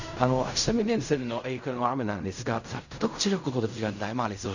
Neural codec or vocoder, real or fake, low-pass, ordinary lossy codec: codec, 16 kHz in and 24 kHz out, 0.4 kbps, LongCat-Audio-Codec, fine tuned four codebook decoder; fake; 7.2 kHz; none